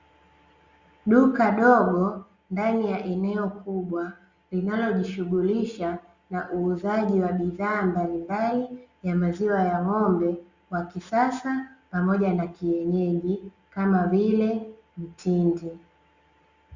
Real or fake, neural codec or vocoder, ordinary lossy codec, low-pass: real; none; Opus, 64 kbps; 7.2 kHz